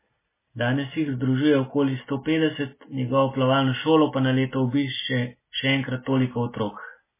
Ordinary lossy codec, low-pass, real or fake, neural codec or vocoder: MP3, 16 kbps; 3.6 kHz; real; none